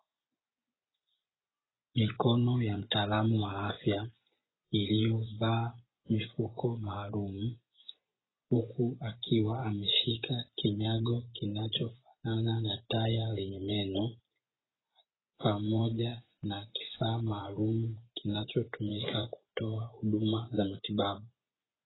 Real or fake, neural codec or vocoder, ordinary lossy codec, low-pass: fake; vocoder, 24 kHz, 100 mel bands, Vocos; AAC, 16 kbps; 7.2 kHz